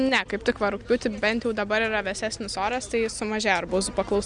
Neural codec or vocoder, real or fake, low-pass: none; real; 9.9 kHz